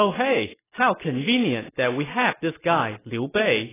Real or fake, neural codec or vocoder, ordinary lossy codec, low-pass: real; none; AAC, 16 kbps; 3.6 kHz